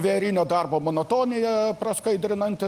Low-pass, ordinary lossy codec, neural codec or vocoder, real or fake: 14.4 kHz; Opus, 32 kbps; none; real